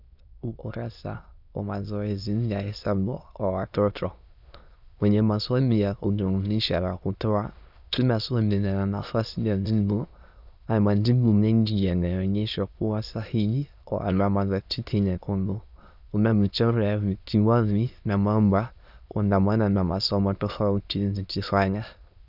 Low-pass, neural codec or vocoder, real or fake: 5.4 kHz; autoencoder, 22.05 kHz, a latent of 192 numbers a frame, VITS, trained on many speakers; fake